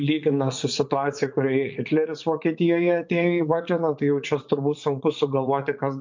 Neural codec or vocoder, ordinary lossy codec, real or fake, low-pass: vocoder, 44.1 kHz, 80 mel bands, Vocos; MP3, 48 kbps; fake; 7.2 kHz